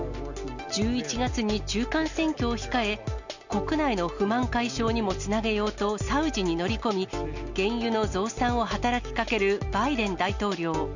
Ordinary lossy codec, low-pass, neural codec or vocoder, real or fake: none; 7.2 kHz; none; real